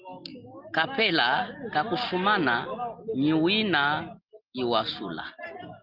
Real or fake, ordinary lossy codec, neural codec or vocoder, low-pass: real; Opus, 32 kbps; none; 5.4 kHz